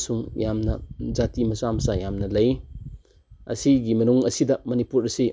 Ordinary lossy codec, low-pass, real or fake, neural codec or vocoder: none; none; real; none